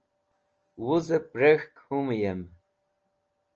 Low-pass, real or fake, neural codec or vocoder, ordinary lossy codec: 7.2 kHz; real; none; Opus, 24 kbps